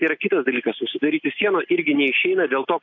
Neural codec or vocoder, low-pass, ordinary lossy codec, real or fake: none; 7.2 kHz; MP3, 64 kbps; real